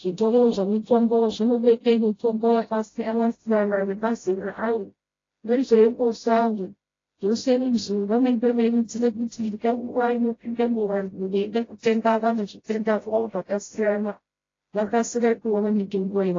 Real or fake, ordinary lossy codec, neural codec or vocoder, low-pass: fake; AAC, 32 kbps; codec, 16 kHz, 0.5 kbps, FreqCodec, smaller model; 7.2 kHz